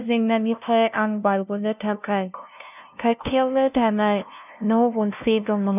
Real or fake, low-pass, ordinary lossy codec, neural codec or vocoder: fake; 3.6 kHz; none; codec, 16 kHz, 0.5 kbps, FunCodec, trained on LibriTTS, 25 frames a second